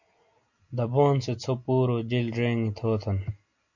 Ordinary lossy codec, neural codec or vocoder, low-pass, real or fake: MP3, 64 kbps; none; 7.2 kHz; real